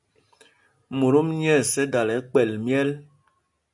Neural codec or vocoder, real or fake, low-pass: none; real; 10.8 kHz